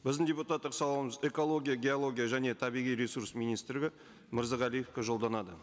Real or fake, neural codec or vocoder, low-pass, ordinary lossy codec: real; none; none; none